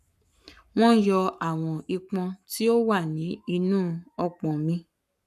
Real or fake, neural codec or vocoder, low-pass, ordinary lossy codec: fake; codec, 44.1 kHz, 7.8 kbps, Pupu-Codec; 14.4 kHz; none